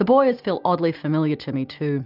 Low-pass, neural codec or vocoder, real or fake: 5.4 kHz; none; real